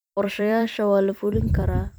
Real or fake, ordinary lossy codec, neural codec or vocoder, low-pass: fake; none; vocoder, 44.1 kHz, 128 mel bands every 256 samples, BigVGAN v2; none